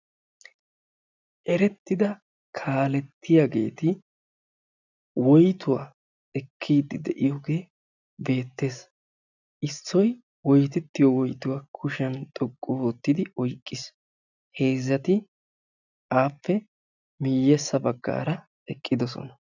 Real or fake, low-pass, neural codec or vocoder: real; 7.2 kHz; none